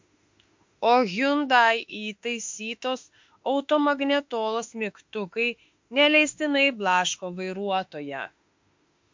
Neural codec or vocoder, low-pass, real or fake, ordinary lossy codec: autoencoder, 48 kHz, 32 numbers a frame, DAC-VAE, trained on Japanese speech; 7.2 kHz; fake; MP3, 48 kbps